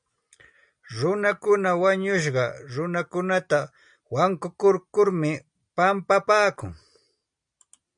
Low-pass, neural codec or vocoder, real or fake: 9.9 kHz; none; real